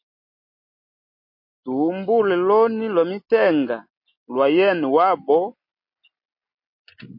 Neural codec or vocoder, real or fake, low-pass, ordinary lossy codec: none; real; 5.4 kHz; MP3, 32 kbps